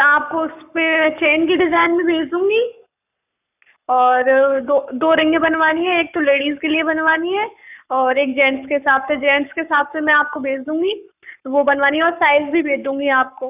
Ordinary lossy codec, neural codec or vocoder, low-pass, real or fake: none; codec, 44.1 kHz, 7.8 kbps, Pupu-Codec; 3.6 kHz; fake